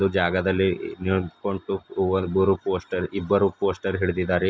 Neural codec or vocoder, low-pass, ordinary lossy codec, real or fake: none; none; none; real